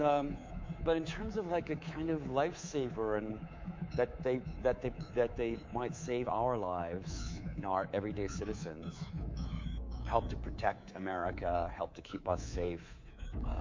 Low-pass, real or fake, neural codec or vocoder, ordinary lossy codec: 7.2 kHz; fake; codec, 24 kHz, 3.1 kbps, DualCodec; MP3, 48 kbps